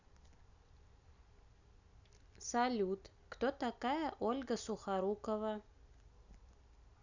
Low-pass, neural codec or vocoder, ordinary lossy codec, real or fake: 7.2 kHz; none; none; real